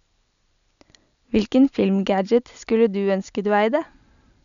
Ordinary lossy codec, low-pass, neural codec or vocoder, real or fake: none; 7.2 kHz; none; real